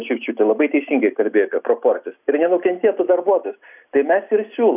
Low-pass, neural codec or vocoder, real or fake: 3.6 kHz; none; real